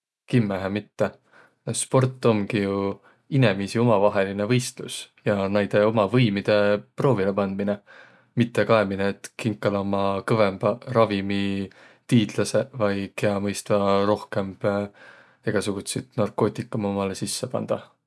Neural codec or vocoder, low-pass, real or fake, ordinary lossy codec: none; none; real; none